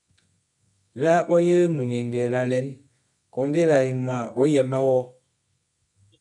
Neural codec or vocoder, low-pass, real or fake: codec, 24 kHz, 0.9 kbps, WavTokenizer, medium music audio release; 10.8 kHz; fake